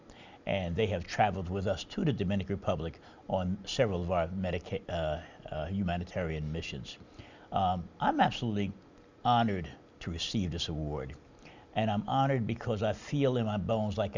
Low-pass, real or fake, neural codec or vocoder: 7.2 kHz; real; none